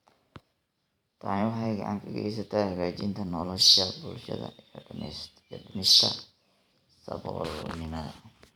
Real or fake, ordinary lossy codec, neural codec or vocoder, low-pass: fake; none; vocoder, 44.1 kHz, 128 mel bands every 512 samples, BigVGAN v2; 19.8 kHz